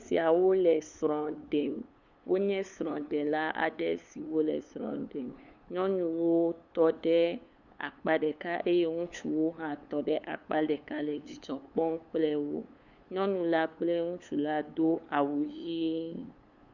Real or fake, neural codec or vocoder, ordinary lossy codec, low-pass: fake; codec, 16 kHz, 8 kbps, FunCodec, trained on LibriTTS, 25 frames a second; Opus, 64 kbps; 7.2 kHz